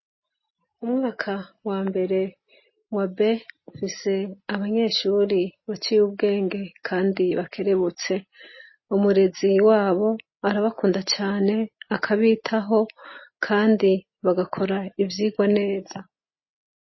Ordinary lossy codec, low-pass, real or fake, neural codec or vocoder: MP3, 24 kbps; 7.2 kHz; real; none